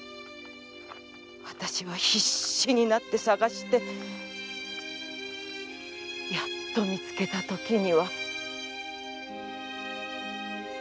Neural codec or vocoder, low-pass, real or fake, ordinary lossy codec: none; none; real; none